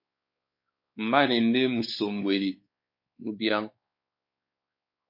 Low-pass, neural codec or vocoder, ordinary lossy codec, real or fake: 5.4 kHz; codec, 16 kHz, 4 kbps, X-Codec, WavLM features, trained on Multilingual LibriSpeech; MP3, 32 kbps; fake